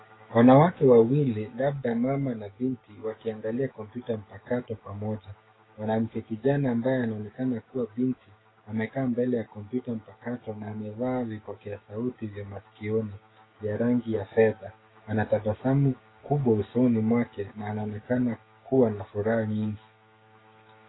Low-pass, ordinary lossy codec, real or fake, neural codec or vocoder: 7.2 kHz; AAC, 16 kbps; fake; codec, 16 kHz, 6 kbps, DAC